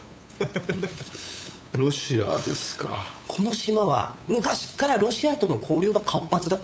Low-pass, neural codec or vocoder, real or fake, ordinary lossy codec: none; codec, 16 kHz, 8 kbps, FunCodec, trained on LibriTTS, 25 frames a second; fake; none